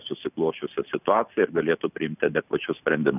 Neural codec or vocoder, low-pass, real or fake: none; 3.6 kHz; real